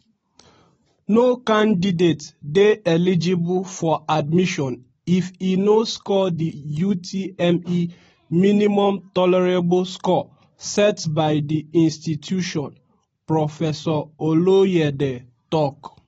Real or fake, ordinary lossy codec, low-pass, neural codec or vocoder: real; AAC, 24 kbps; 19.8 kHz; none